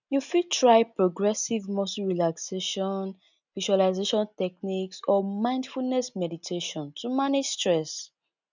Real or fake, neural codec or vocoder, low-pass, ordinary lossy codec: real; none; 7.2 kHz; none